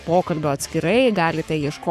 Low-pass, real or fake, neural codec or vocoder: 14.4 kHz; fake; codec, 44.1 kHz, 7.8 kbps, Pupu-Codec